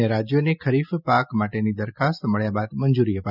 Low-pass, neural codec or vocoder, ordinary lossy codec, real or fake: 5.4 kHz; none; none; real